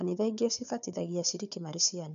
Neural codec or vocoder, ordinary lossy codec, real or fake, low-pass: codec, 16 kHz, 4 kbps, FunCodec, trained on Chinese and English, 50 frames a second; none; fake; 7.2 kHz